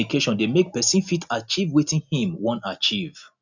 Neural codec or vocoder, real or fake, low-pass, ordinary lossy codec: none; real; 7.2 kHz; none